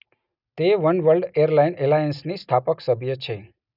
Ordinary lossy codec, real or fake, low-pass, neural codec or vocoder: none; real; 5.4 kHz; none